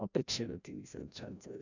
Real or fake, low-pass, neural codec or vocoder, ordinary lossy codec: fake; 7.2 kHz; codec, 16 kHz, 0.5 kbps, FreqCodec, larger model; none